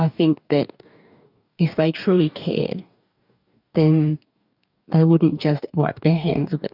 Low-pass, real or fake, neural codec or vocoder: 5.4 kHz; fake; codec, 44.1 kHz, 2.6 kbps, DAC